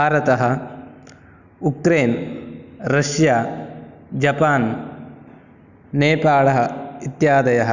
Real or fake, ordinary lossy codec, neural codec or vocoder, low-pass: real; none; none; 7.2 kHz